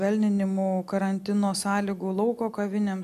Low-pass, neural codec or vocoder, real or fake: 14.4 kHz; none; real